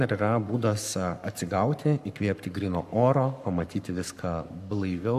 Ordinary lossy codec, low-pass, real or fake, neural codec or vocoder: MP3, 96 kbps; 14.4 kHz; fake; codec, 44.1 kHz, 7.8 kbps, Pupu-Codec